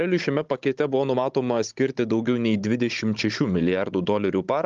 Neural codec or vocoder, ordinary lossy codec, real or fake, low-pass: codec, 16 kHz, 16 kbps, FunCodec, trained on Chinese and English, 50 frames a second; Opus, 32 kbps; fake; 7.2 kHz